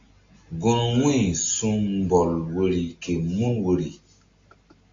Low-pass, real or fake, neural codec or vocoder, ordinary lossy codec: 7.2 kHz; real; none; AAC, 48 kbps